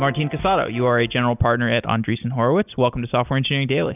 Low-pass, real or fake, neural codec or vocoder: 3.6 kHz; real; none